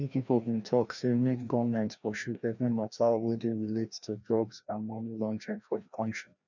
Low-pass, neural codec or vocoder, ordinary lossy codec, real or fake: 7.2 kHz; codec, 16 kHz, 1 kbps, FreqCodec, larger model; none; fake